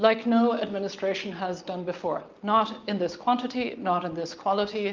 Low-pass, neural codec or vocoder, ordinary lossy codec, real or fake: 7.2 kHz; none; Opus, 24 kbps; real